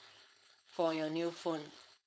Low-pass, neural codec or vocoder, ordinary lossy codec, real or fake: none; codec, 16 kHz, 4.8 kbps, FACodec; none; fake